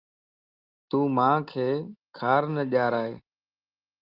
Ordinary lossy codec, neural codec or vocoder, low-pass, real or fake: Opus, 32 kbps; none; 5.4 kHz; real